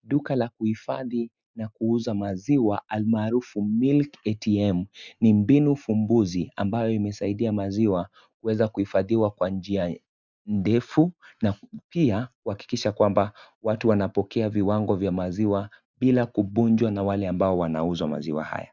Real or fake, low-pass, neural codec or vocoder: real; 7.2 kHz; none